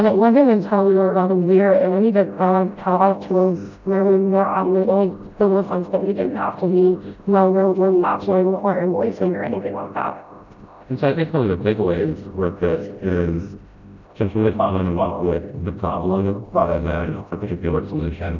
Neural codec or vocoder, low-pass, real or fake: codec, 16 kHz, 0.5 kbps, FreqCodec, smaller model; 7.2 kHz; fake